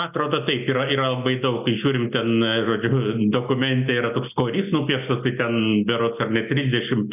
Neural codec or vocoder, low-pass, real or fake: none; 3.6 kHz; real